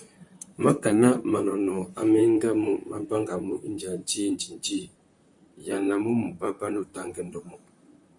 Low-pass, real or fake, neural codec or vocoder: 10.8 kHz; fake; vocoder, 44.1 kHz, 128 mel bands, Pupu-Vocoder